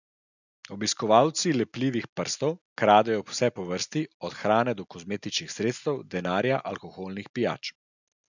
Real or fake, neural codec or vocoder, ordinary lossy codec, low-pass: real; none; none; 7.2 kHz